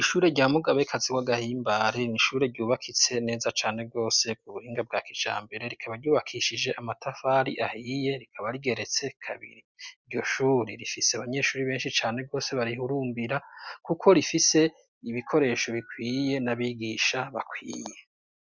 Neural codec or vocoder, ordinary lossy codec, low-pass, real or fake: none; Opus, 64 kbps; 7.2 kHz; real